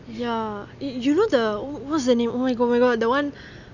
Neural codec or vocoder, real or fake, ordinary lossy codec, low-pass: none; real; none; 7.2 kHz